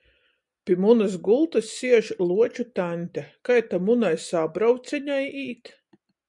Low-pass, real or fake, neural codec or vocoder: 10.8 kHz; real; none